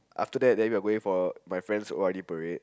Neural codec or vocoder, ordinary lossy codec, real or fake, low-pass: none; none; real; none